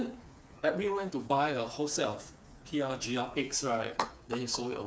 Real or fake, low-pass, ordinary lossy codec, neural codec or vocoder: fake; none; none; codec, 16 kHz, 4 kbps, FreqCodec, smaller model